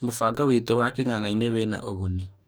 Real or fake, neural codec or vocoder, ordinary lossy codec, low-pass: fake; codec, 44.1 kHz, 2.6 kbps, DAC; none; none